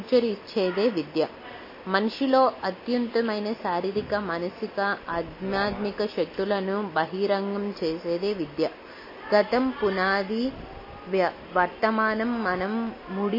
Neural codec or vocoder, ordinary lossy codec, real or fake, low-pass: none; MP3, 24 kbps; real; 5.4 kHz